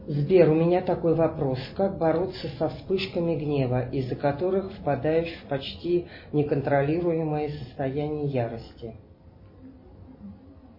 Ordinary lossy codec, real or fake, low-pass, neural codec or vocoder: MP3, 24 kbps; real; 5.4 kHz; none